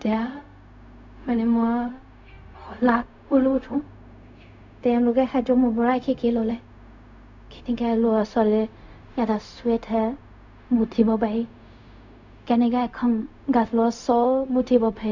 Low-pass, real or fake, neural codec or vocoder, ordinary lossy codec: 7.2 kHz; fake; codec, 16 kHz, 0.4 kbps, LongCat-Audio-Codec; none